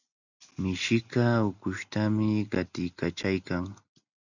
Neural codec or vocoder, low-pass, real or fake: none; 7.2 kHz; real